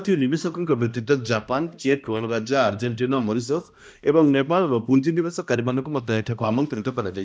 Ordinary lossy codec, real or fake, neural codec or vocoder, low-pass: none; fake; codec, 16 kHz, 1 kbps, X-Codec, HuBERT features, trained on balanced general audio; none